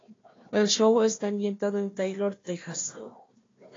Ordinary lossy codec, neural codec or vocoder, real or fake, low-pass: AAC, 32 kbps; codec, 16 kHz, 1 kbps, FunCodec, trained on Chinese and English, 50 frames a second; fake; 7.2 kHz